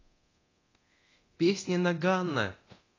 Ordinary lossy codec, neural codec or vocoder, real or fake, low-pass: AAC, 32 kbps; codec, 24 kHz, 0.9 kbps, DualCodec; fake; 7.2 kHz